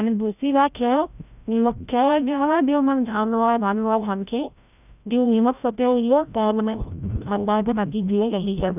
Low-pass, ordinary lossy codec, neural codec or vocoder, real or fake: 3.6 kHz; none; codec, 16 kHz, 0.5 kbps, FreqCodec, larger model; fake